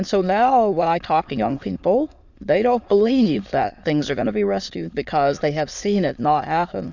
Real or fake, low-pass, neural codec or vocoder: fake; 7.2 kHz; autoencoder, 22.05 kHz, a latent of 192 numbers a frame, VITS, trained on many speakers